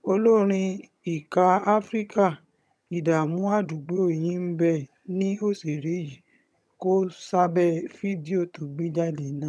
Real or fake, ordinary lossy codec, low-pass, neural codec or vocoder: fake; none; none; vocoder, 22.05 kHz, 80 mel bands, HiFi-GAN